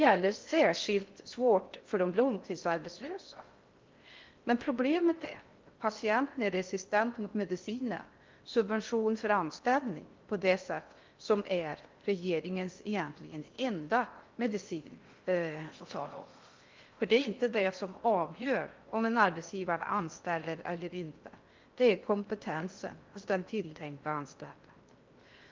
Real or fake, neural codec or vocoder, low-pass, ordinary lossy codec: fake; codec, 16 kHz in and 24 kHz out, 0.6 kbps, FocalCodec, streaming, 4096 codes; 7.2 kHz; Opus, 24 kbps